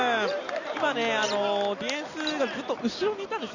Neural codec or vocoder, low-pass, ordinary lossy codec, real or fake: none; 7.2 kHz; none; real